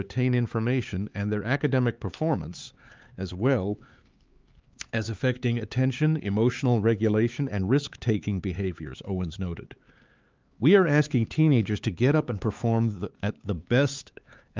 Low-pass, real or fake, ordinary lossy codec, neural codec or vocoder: 7.2 kHz; fake; Opus, 32 kbps; codec, 16 kHz, 4 kbps, X-Codec, HuBERT features, trained on LibriSpeech